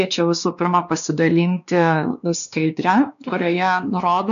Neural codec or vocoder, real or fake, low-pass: codec, 16 kHz, 2 kbps, X-Codec, WavLM features, trained on Multilingual LibriSpeech; fake; 7.2 kHz